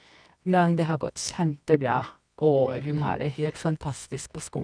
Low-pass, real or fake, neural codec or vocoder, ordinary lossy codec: 9.9 kHz; fake; codec, 24 kHz, 0.9 kbps, WavTokenizer, medium music audio release; none